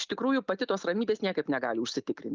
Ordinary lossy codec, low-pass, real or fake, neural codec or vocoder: Opus, 24 kbps; 7.2 kHz; real; none